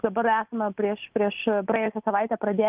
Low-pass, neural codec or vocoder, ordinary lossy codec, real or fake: 3.6 kHz; none; Opus, 24 kbps; real